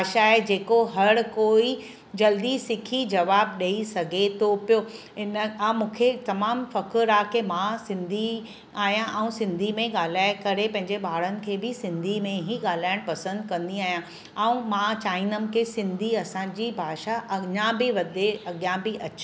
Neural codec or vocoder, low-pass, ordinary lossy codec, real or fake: none; none; none; real